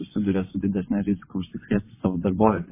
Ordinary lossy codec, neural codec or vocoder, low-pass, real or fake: MP3, 16 kbps; vocoder, 22.05 kHz, 80 mel bands, WaveNeXt; 3.6 kHz; fake